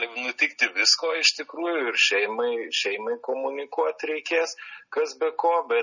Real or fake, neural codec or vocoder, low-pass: real; none; 7.2 kHz